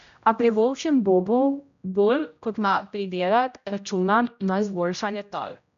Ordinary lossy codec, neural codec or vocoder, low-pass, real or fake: none; codec, 16 kHz, 0.5 kbps, X-Codec, HuBERT features, trained on general audio; 7.2 kHz; fake